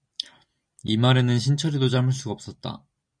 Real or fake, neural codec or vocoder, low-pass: real; none; 9.9 kHz